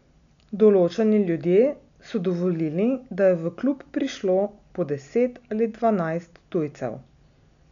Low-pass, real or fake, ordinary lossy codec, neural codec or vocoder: 7.2 kHz; real; MP3, 96 kbps; none